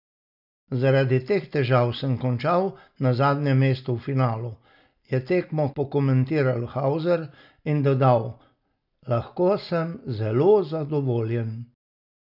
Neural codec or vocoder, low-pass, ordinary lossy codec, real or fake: none; 5.4 kHz; none; real